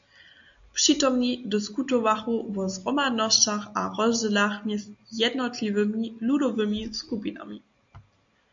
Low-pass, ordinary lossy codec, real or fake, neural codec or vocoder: 7.2 kHz; AAC, 64 kbps; real; none